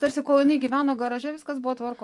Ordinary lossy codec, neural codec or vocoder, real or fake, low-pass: MP3, 96 kbps; vocoder, 24 kHz, 100 mel bands, Vocos; fake; 10.8 kHz